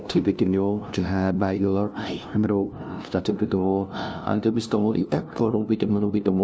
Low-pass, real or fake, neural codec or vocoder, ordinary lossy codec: none; fake; codec, 16 kHz, 0.5 kbps, FunCodec, trained on LibriTTS, 25 frames a second; none